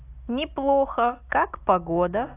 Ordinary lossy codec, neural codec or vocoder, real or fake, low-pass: AAC, 24 kbps; none; real; 3.6 kHz